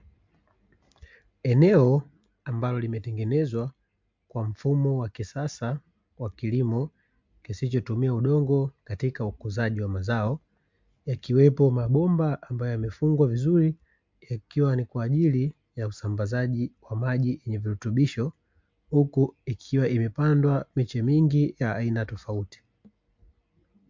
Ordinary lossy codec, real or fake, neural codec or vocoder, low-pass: MP3, 64 kbps; real; none; 7.2 kHz